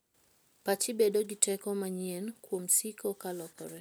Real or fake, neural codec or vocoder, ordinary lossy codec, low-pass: real; none; none; none